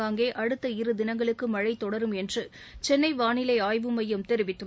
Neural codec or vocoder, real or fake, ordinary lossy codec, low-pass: none; real; none; none